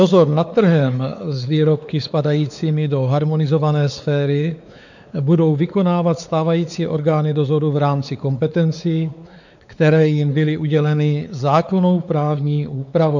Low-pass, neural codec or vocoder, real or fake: 7.2 kHz; codec, 16 kHz, 4 kbps, X-Codec, WavLM features, trained on Multilingual LibriSpeech; fake